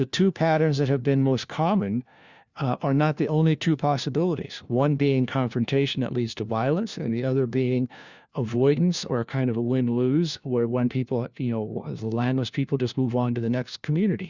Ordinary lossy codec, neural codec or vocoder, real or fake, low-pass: Opus, 64 kbps; codec, 16 kHz, 1 kbps, FunCodec, trained on LibriTTS, 50 frames a second; fake; 7.2 kHz